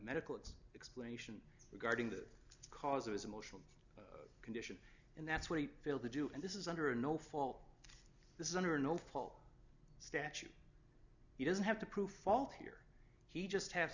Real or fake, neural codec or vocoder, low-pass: real; none; 7.2 kHz